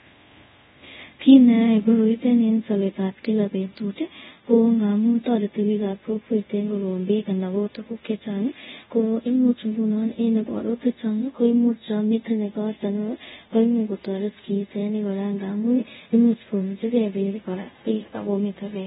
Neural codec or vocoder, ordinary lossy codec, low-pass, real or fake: codec, 24 kHz, 0.5 kbps, DualCodec; AAC, 16 kbps; 10.8 kHz; fake